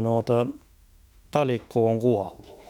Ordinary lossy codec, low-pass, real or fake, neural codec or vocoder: none; 19.8 kHz; fake; autoencoder, 48 kHz, 32 numbers a frame, DAC-VAE, trained on Japanese speech